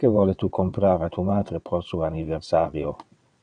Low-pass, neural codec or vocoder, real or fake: 9.9 kHz; vocoder, 22.05 kHz, 80 mel bands, WaveNeXt; fake